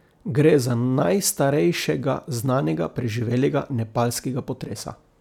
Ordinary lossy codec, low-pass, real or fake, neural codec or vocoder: none; 19.8 kHz; real; none